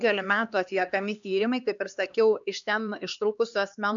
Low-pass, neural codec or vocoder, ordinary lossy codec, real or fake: 7.2 kHz; codec, 16 kHz, 2 kbps, X-Codec, HuBERT features, trained on LibriSpeech; MP3, 96 kbps; fake